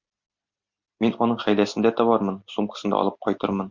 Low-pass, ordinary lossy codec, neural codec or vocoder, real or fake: 7.2 kHz; Opus, 64 kbps; none; real